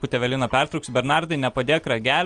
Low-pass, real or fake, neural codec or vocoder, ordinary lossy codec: 9.9 kHz; real; none; Opus, 24 kbps